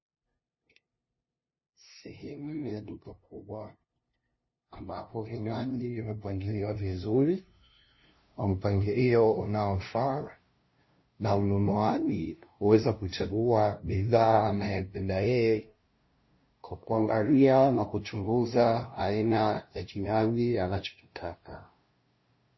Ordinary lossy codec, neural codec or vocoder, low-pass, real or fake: MP3, 24 kbps; codec, 16 kHz, 0.5 kbps, FunCodec, trained on LibriTTS, 25 frames a second; 7.2 kHz; fake